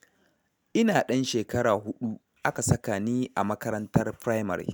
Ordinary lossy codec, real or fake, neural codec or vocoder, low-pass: none; real; none; none